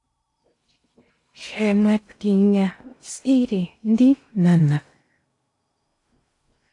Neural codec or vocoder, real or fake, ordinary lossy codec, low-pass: codec, 16 kHz in and 24 kHz out, 0.6 kbps, FocalCodec, streaming, 2048 codes; fake; AAC, 48 kbps; 10.8 kHz